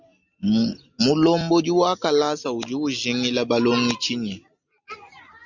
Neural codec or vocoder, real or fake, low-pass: none; real; 7.2 kHz